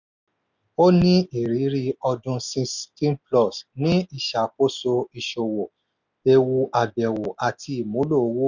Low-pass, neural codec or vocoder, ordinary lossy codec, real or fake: 7.2 kHz; none; none; real